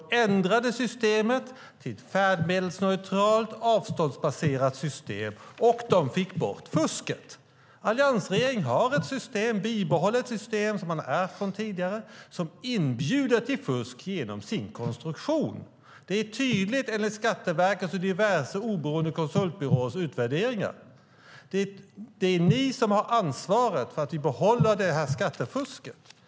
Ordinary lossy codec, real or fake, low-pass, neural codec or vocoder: none; real; none; none